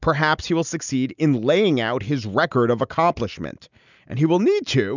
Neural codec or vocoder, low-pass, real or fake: none; 7.2 kHz; real